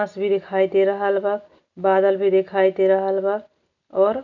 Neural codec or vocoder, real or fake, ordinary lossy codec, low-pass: none; real; none; 7.2 kHz